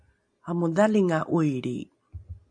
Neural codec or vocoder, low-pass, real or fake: none; 9.9 kHz; real